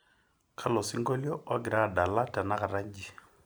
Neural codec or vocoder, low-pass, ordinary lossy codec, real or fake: none; none; none; real